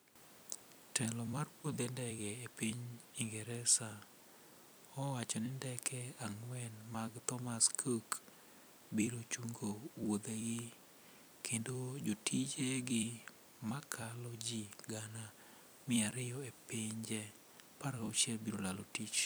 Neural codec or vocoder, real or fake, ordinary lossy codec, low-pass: vocoder, 44.1 kHz, 128 mel bands every 256 samples, BigVGAN v2; fake; none; none